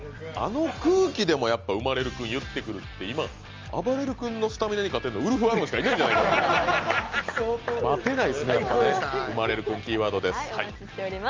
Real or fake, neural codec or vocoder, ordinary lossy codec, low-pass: real; none; Opus, 32 kbps; 7.2 kHz